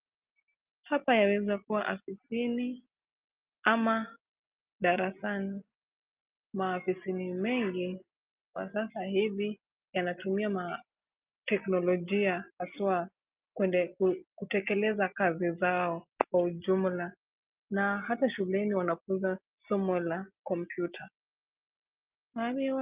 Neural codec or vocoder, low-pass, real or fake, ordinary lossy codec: none; 3.6 kHz; real; Opus, 24 kbps